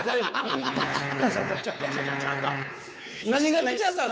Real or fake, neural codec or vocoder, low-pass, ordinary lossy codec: fake; codec, 16 kHz, 4 kbps, X-Codec, WavLM features, trained on Multilingual LibriSpeech; none; none